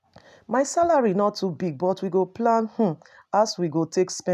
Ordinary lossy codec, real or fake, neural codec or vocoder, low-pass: none; real; none; 14.4 kHz